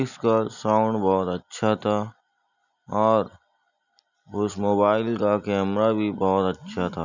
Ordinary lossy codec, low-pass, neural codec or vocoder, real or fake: none; 7.2 kHz; none; real